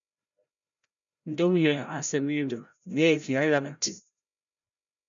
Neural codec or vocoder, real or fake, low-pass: codec, 16 kHz, 0.5 kbps, FreqCodec, larger model; fake; 7.2 kHz